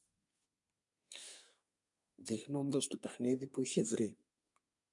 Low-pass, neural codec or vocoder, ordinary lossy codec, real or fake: 10.8 kHz; codec, 24 kHz, 1 kbps, SNAC; MP3, 96 kbps; fake